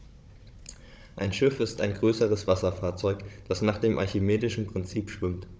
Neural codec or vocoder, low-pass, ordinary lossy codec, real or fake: codec, 16 kHz, 16 kbps, FunCodec, trained on Chinese and English, 50 frames a second; none; none; fake